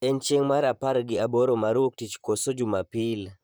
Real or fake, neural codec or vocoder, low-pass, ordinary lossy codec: fake; vocoder, 44.1 kHz, 128 mel bands, Pupu-Vocoder; none; none